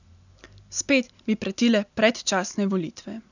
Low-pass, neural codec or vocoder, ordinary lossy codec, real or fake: 7.2 kHz; none; none; real